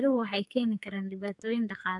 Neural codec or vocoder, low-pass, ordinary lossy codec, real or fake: codec, 44.1 kHz, 2.6 kbps, SNAC; 10.8 kHz; AAC, 64 kbps; fake